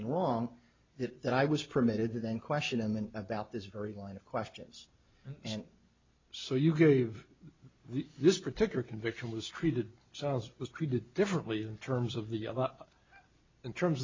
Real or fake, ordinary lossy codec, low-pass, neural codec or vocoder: real; MP3, 64 kbps; 7.2 kHz; none